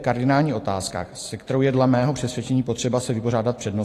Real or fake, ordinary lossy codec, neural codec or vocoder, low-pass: fake; AAC, 48 kbps; vocoder, 44.1 kHz, 128 mel bands every 256 samples, BigVGAN v2; 14.4 kHz